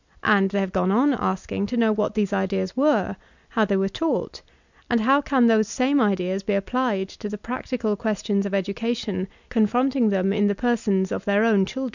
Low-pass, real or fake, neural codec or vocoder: 7.2 kHz; real; none